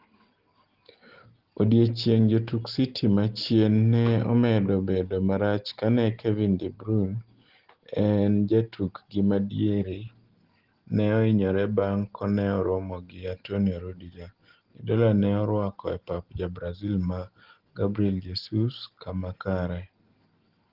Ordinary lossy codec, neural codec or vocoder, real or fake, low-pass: Opus, 16 kbps; none; real; 5.4 kHz